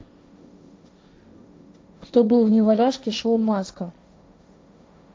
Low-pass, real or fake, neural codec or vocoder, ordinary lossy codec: none; fake; codec, 16 kHz, 1.1 kbps, Voila-Tokenizer; none